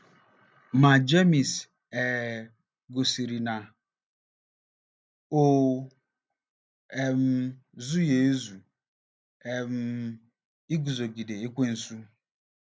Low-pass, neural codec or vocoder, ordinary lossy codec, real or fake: none; none; none; real